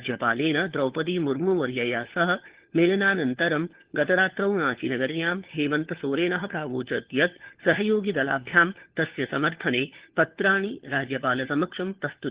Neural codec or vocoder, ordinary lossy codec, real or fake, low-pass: codec, 16 kHz, 4 kbps, FunCodec, trained on LibriTTS, 50 frames a second; Opus, 16 kbps; fake; 3.6 kHz